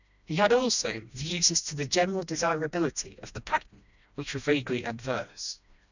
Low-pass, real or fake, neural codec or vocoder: 7.2 kHz; fake; codec, 16 kHz, 1 kbps, FreqCodec, smaller model